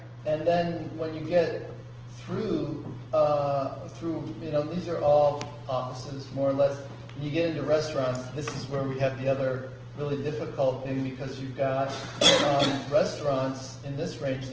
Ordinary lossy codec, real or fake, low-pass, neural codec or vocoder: Opus, 16 kbps; real; 7.2 kHz; none